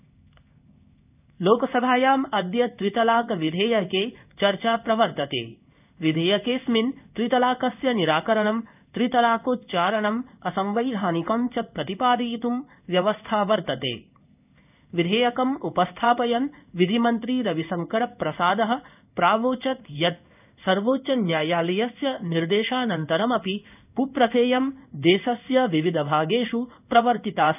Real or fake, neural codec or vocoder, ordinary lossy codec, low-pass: fake; codec, 16 kHz in and 24 kHz out, 1 kbps, XY-Tokenizer; none; 3.6 kHz